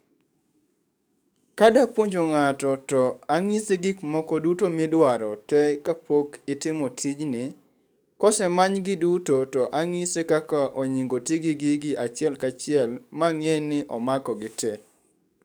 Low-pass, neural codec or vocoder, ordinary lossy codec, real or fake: none; codec, 44.1 kHz, 7.8 kbps, DAC; none; fake